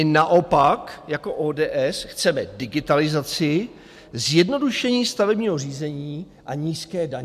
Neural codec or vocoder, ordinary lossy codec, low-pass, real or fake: none; MP3, 96 kbps; 14.4 kHz; real